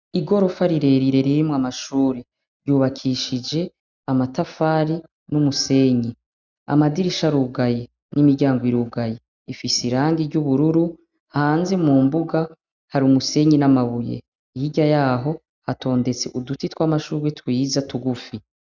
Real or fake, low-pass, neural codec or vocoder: real; 7.2 kHz; none